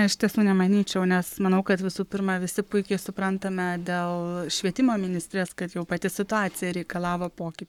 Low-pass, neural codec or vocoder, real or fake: 19.8 kHz; codec, 44.1 kHz, 7.8 kbps, Pupu-Codec; fake